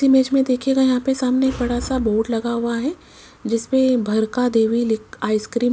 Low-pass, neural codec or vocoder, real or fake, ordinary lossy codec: none; none; real; none